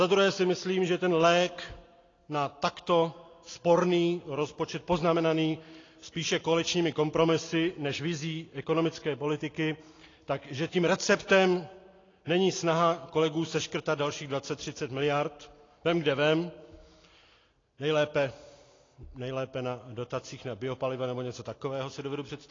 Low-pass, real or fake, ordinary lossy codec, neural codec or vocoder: 7.2 kHz; real; AAC, 32 kbps; none